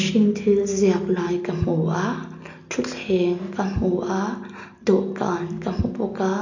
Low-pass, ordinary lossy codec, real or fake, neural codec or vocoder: 7.2 kHz; none; real; none